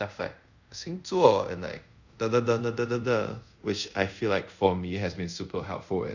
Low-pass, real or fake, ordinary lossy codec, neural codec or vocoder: 7.2 kHz; fake; none; codec, 24 kHz, 0.5 kbps, DualCodec